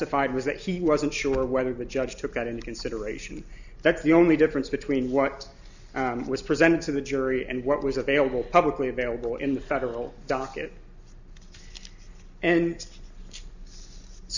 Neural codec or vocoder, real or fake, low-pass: none; real; 7.2 kHz